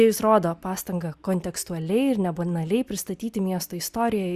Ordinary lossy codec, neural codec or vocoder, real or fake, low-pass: Opus, 64 kbps; none; real; 14.4 kHz